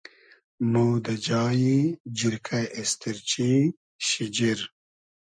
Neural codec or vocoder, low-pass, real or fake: none; 9.9 kHz; real